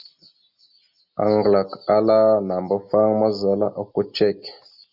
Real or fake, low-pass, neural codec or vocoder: real; 5.4 kHz; none